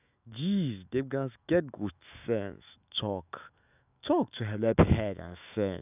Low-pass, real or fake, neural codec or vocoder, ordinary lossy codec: 3.6 kHz; fake; autoencoder, 48 kHz, 128 numbers a frame, DAC-VAE, trained on Japanese speech; none